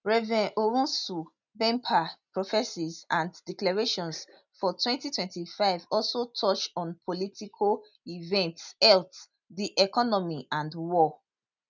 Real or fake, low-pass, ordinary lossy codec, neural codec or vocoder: real; 7.2 kHz; none; none